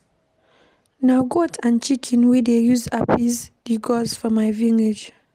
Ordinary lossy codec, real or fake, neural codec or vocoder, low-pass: Opus, 24 kbps; real; none; 14.4 kHz